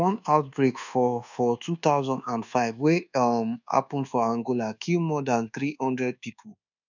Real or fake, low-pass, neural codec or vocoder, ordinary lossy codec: fake; 7.2 kHz; codec, 24 kHz, 1.2 kbps, DualCodec; none